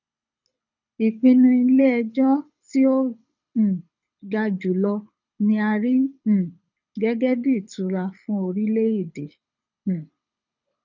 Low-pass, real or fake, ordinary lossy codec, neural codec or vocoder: 7.2 kHz; fake; none; codec, 24 kHz, 6 kbps, HILCodec